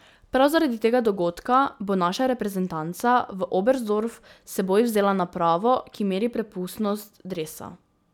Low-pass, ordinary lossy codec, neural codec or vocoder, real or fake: 19.8 kHz; none; none; real